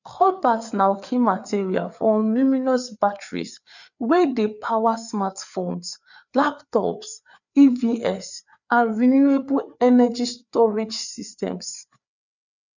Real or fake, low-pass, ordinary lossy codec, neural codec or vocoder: fake; 7.2 kHz; none; codec, 16 kHz, 4 kbps, FreqCodec, larger model